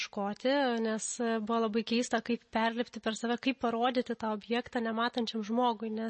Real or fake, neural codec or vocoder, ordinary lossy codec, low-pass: real; none; MP3, 32 kbps; 10.8 kHz